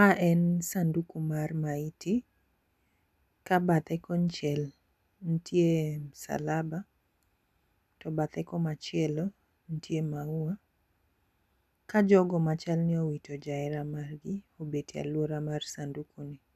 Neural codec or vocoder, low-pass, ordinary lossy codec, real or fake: none; 14.4 kHz; none; real